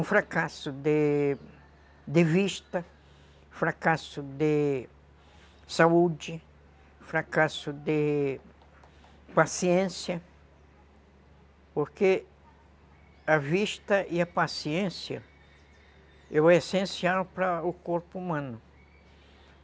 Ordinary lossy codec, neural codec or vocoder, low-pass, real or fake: none; none; none; real